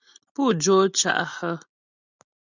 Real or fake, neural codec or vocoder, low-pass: real; none; 7.2 kHz